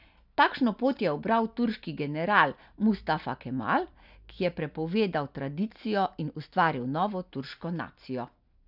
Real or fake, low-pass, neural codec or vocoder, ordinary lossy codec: real; 5.4 kHz; none; none